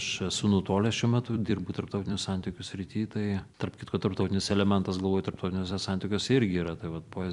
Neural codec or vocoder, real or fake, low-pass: vocoder, 44.1 kHz, 128 mel bands every 256 samples, BigVGAN v2; fake; 10.8 kHz